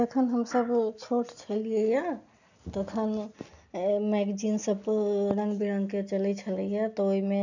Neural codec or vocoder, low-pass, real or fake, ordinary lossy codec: none; 7.2 kHz; real; none